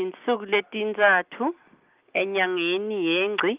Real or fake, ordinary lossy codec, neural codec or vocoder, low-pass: real; Opus, 24 kbps; none; 3.6 kHz